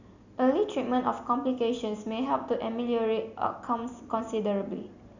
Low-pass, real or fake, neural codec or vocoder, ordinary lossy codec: 7.2 kHz; real; none; none